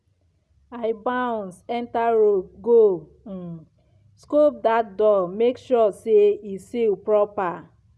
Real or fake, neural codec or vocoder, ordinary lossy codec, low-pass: real; none; none; none